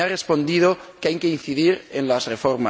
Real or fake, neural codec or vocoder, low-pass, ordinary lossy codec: real; none; none; none